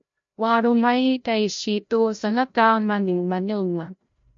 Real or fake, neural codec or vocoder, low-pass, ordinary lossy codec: fake; codec, 16 kHz, 0.5 kbps, FreqCodec, larger model; 7.2 kHz; MP3, 48 kbps